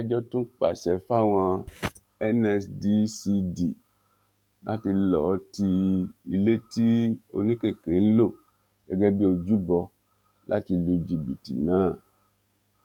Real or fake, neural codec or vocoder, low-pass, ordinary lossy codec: fake; codec, 44.1 kHz, 7.8 kbps, DAC; 19.8 kHz; none